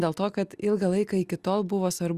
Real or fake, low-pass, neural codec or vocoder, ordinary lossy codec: real; 14.4 kHz; none; Opus, 64 kbps